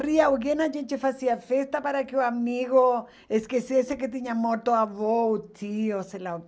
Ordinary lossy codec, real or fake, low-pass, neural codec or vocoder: none; real; none; none